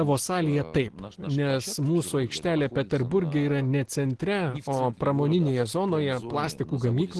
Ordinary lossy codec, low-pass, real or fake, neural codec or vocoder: Opus, 16 kbps; 10.8 kHz; real; none